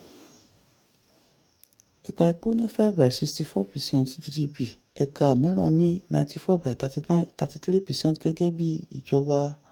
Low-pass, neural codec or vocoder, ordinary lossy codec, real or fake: 19.8 kHz; codec, 44.1 kHz, 2.6 kbps, DAC; MP3, 96 kbps; fake